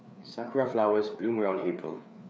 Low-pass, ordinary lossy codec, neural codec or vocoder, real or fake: none; none; codec, 16 kHz, 4 kbps, FreqCodec, larger model; fake